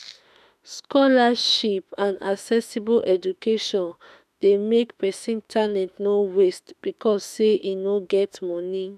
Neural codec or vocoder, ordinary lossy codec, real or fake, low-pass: autoencoder, 48 kHz, 32 numbers a frame, DAC-VAE, trained on Japanese speech; none; fake; 14.4 kHz